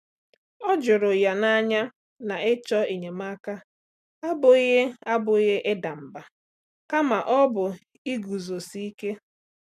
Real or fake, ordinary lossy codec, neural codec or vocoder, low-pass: real; MP3, 96 kbps; none; 14.4 kHz